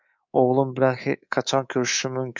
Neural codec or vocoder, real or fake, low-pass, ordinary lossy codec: codec, 24 kHz, 3.1 kbps, DualCodec; fake; 7.2 kHz; MP3, 64 kbps